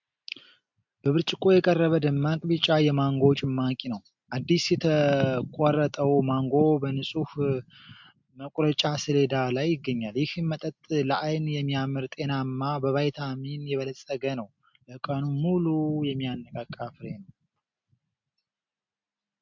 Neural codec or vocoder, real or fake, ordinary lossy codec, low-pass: none; real; MP3, 64 kbps; 7.2 kHz